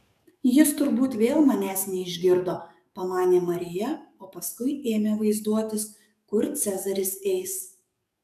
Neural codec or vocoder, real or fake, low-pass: codec, 44.1 kHz, 7.8 kbps, DAC; fake; 14.4 kHz